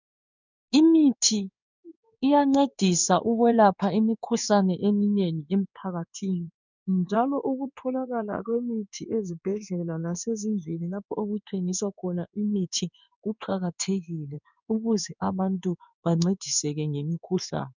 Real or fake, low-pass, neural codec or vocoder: fake; 7.2 kHz; codec, 16 kHz in and 24 kHz out, 1 kbps, XY-Tokenizer